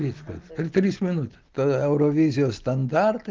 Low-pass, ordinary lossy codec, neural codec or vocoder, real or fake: 7.2 kHz; Opus, 16 kbps; none; real